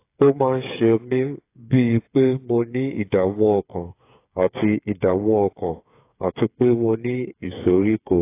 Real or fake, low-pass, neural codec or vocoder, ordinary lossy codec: fake; 3.6 kHz; codec, 16 kHz, 8 kbps, FreqCodec, smaller model; AAC, 24 kbps